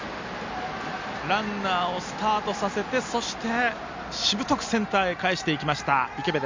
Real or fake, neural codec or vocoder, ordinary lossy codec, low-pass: real; none; MP3, 64 kbps; 7.2 kHz